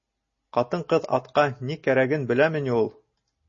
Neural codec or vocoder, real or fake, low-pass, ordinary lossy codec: none; real; 7.2 kHz; MP3, 32 kbps